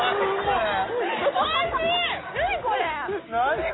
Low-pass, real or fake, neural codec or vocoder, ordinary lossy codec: 7.2 kHz; real; none; AAC, 16 kbps